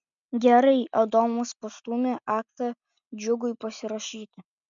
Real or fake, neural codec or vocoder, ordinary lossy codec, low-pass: real; none; MP3, 96 kbps; 7.2 kHz